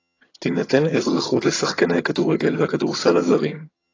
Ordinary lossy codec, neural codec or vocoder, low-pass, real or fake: AAC, 32 kbps; vocoder, 22.05 kHz, 80 mel bands, HiFi-GAN; 7.2 kHz; fake